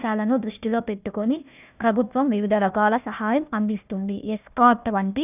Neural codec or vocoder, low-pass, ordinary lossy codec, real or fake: codec, 16 kHz, 1 kbps, FunCodec, trained on Chinese and English, 50 frames a second; 3.6 kHz; none; fake